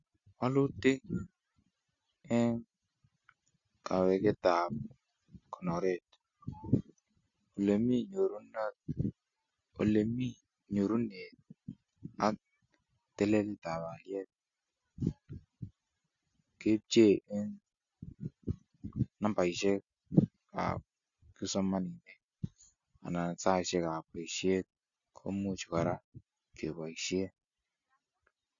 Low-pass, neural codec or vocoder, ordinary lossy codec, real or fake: 7.2 kHz; none; none; real